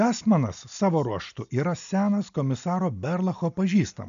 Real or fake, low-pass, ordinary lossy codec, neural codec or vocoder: real; 7.2 kHz; MP3, 96 kbps; none